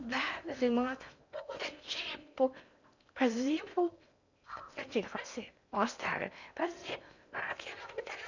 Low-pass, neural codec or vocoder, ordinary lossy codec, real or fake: 7.2 kHz; codec, 16 kHz in and 24 kHz out, 0.8 kbps, FocalCodec, streaming, 65536 codes; none; fake